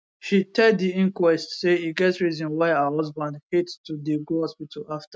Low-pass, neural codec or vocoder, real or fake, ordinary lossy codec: none; none; real; none